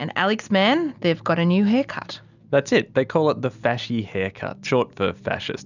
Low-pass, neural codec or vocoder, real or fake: 7.2 kHz; none; real